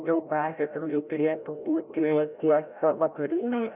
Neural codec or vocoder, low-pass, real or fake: codec, 16 kHz, 0.5 kbps, FreqCodec, larger model; 3.6 kHz; fake